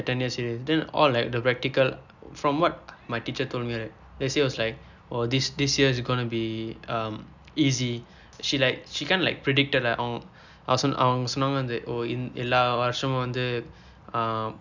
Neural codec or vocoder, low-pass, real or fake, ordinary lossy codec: none; 7.2 kHz; real; none